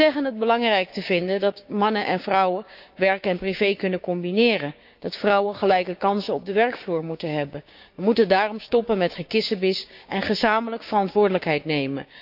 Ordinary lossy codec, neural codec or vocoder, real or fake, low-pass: none; codec, 16 kHz, 6 kbps, DAC; fake; 5.4 kHz